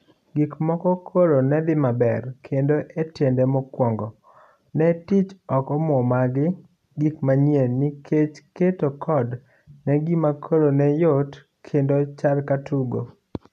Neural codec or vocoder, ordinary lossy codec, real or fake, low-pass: none; none; real; 14.4 kHz